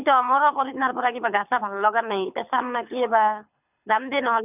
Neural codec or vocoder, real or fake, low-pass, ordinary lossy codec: codec, 24 kHz, 6 kbps, HILCodec; fake; 3.6 kHz; none